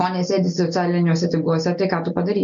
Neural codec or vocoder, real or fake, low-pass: none; real; 7.2 kHz